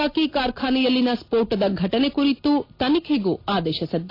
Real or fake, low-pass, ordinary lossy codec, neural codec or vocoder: real; 5.4 kHz; AAC, 32 kbps; none